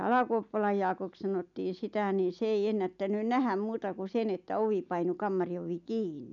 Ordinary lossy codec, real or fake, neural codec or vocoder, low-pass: MP3, 96 kbps; real; none; 7.2 kHz